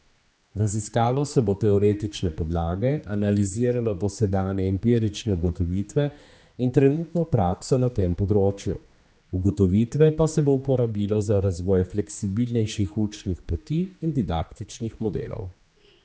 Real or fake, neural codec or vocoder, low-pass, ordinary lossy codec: fake; codec, 16 kHz, 2 kbps, X-Codec, HuBERT features, trained on general audio; none; none